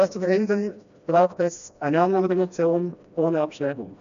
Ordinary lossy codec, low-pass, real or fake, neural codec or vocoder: none; 7.2 kHz; fake; codec, 16 kHz, 1 kbps, FreqCodec, smaller model